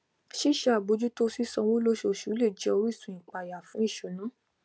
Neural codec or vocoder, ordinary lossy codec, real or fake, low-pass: none; none; real; none